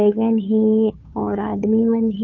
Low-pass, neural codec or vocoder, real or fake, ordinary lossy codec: 7.2 kHz; codec, 16 kHz, 2 kbps, FunCodec, trained on Chinese and English, 25 frames a second; fake; none